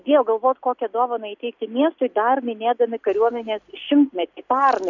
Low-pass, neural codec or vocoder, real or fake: 7.2 kHz; none; real